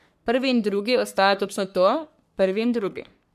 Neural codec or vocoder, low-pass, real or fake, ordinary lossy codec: codec, 44.1 kHz, 3.4 kbps, Pupu-Codec; 14.4 kHz; fake; none